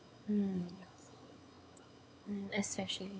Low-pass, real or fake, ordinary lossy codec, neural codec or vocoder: none; fake; none; codec, 16 kHz, 4 kbps, X-Codec, WavLM features, trained on Multilingual LibriSpeech